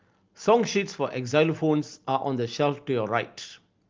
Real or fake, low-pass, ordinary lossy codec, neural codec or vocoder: real; 7.2 kHz; Opus, 24 kbps; none